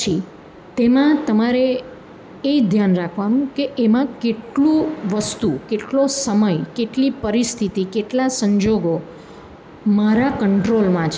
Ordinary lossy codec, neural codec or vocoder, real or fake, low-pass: none; none; real; none